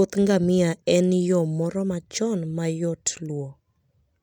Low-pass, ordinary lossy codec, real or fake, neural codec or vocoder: 19.8 kHz; none; real; none